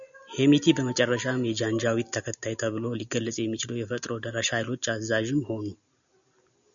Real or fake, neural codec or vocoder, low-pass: real; none; 7.2 kHz